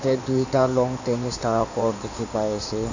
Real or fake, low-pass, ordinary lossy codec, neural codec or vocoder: fake; 7.2 kHz; none; codec, 16 kHz in and 24 kHz out, 2.2 kbps, FireRedTTS-2 codec